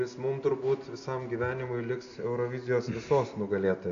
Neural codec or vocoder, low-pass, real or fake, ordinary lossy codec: none; 7.2 kHz; real; AAC, 48 kbps